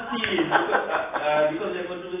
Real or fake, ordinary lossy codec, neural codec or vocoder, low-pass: real; AAC, 16 kbps; none; 3.6 kHz